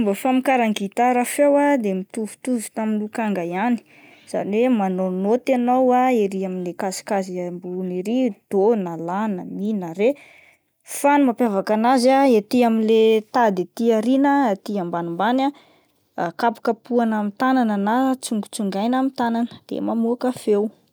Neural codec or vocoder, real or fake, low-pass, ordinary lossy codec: none; real; none; none